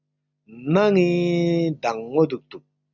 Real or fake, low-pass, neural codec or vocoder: real; 7.2 kHz; none